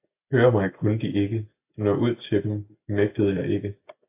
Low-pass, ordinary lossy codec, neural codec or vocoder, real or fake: 3.6 kHz; AAC, 24 kbps; none; real